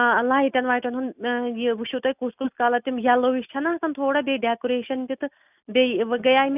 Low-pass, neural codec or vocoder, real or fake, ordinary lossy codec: 3.6 kHz; none; real; none